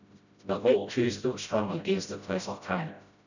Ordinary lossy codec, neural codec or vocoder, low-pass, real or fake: none; codec, 16 kHz, 0.5 kbps, FreqCodec, smaller model; 7.2 kHz; fake